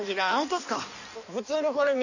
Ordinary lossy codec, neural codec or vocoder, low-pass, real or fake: none; codec, 16 kHz in and 24 kHz out, 1.1 kbps, FireRedTTS-2 codec; 7.2 kHz; fake